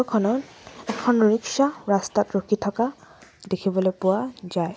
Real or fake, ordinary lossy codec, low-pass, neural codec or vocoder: real; none; none; none